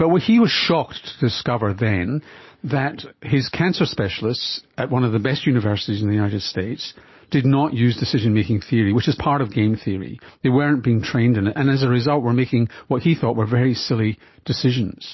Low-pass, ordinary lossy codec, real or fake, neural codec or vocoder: 7.2 kHz; MP3, 24 kbps; real; none